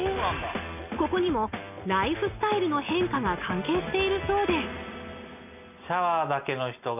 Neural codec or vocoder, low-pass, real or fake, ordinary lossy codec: none; 3.6 kHz; real; none